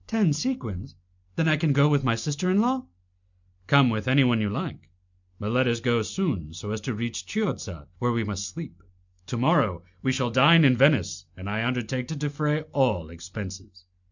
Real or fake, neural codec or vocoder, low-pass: real; none; 7.2 kHz